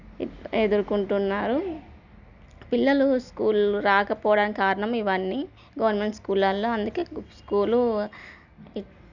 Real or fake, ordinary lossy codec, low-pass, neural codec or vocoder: real; none; 7.2 kHz; none